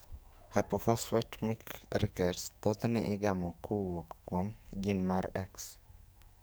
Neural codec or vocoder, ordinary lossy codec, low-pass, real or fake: codec, 44.1 kHz, 2.6 kbps, SNAC; none; none; fake